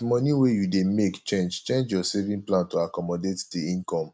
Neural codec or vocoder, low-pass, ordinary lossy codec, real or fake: none; none; none; real